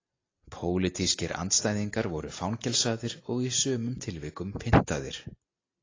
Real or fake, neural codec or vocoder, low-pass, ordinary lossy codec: real; none; 7.2 kHz; AAC, 32 kbps